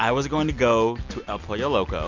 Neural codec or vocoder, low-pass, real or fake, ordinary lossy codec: none; 7.2 kHz; real; Opus, 64 kbps